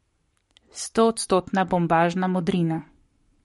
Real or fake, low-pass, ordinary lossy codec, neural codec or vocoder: fake; 19.8 kHz; MP3, 48 kbps; codec, 44.1 kHz, 7.8 kbps, Pupu-Codec